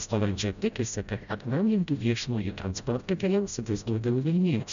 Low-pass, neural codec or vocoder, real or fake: 7.2 kHz; codec, 16 kHz, 0.5 kbps, FreqCodec, smaller model; fake